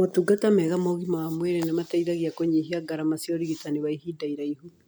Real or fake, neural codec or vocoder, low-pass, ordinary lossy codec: real; none; none; none